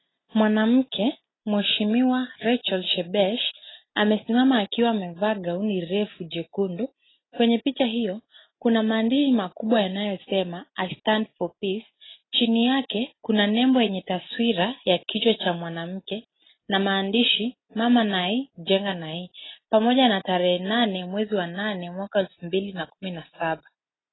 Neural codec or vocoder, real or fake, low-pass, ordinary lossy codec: none; real; 7.2 kHz; AAC, 16 kbps